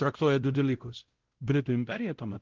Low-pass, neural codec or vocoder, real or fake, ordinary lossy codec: 7.2 kHz; codec, 16 kHz, 0.5 kbps, X-Codec, WavLM features, trained on Multilingual LibriSpeech; fake; Opus, 16 kbps